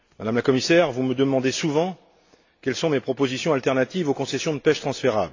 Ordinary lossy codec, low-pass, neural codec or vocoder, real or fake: AAC, 48 kbps; 7.2 kHz; none; real